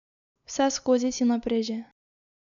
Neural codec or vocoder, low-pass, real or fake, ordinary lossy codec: none; 7.2 kHz; real; none